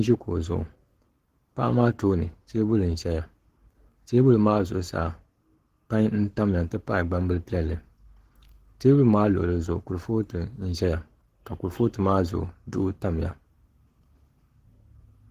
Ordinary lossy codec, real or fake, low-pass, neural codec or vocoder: Opus, 16 kbps; fake; 14.4 kHz; codec, 44.1 kHz, 7.8 kbps, Pupu-Codec